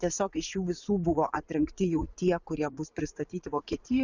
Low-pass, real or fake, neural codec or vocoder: 7.2 kHz; fake; vocoder, 44.1 kHz, 80 mel bands, Vocos